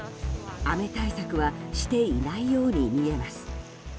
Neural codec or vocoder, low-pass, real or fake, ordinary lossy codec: none; none; real; none